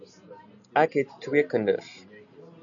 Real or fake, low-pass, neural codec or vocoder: real; 7.2 kHz; none